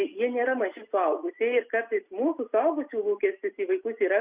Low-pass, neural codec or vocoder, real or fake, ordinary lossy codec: 3.6 kHz; none; real; Opus, 64 kbps